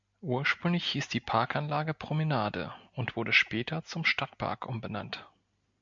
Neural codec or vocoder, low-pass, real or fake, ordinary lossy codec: none; 7.2 kHz; real; MP3, 64 kbps